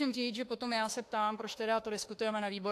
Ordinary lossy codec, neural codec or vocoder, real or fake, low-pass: AAC, 64 kbps; autoencoder, 48 kHz, 32 numbers a frame, DAC-VAE, trained on Japanese speech; fake; 14.4 kHz